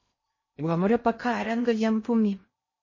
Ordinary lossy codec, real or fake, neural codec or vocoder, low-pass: MP3, 32 kbps; fake; codec, 16 kHz in and 24 kHz out, 0.6 kbps, FocalCodec, streaming, 2048 codes; 7.2 kHz